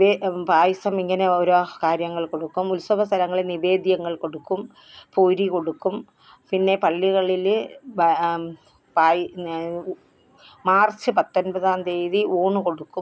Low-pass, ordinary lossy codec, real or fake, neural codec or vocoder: none; none; real; none